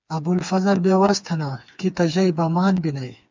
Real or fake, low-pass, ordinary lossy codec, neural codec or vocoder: fake; 7.2 kHz; MP3, 64 kbps; codec, 16 kHz, 4 kbps, FreqCodec, smaller model